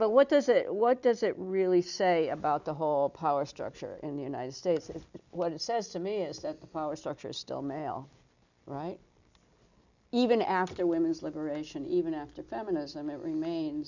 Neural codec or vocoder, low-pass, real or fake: none; 7.2 kHz; real